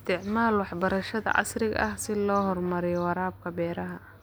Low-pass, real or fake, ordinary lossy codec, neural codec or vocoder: none; real; none; none